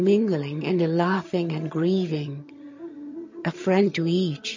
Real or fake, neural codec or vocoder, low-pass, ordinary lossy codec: fake; vocoder, 22.05 kHz, 80 mel bands, HiFi-GAN; 7.2 kHz; MP3, 32 kbps